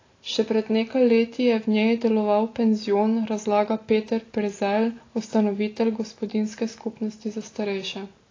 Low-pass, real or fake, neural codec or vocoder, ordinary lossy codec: 7.2 kHz; real; none; AAC, 32 kbps